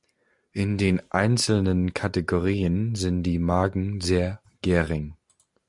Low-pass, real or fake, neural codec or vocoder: 10.8 kHz; real; none